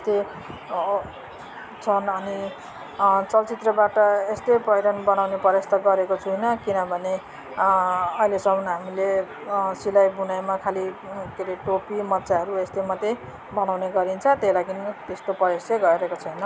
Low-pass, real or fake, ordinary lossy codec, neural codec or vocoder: none; real; none; none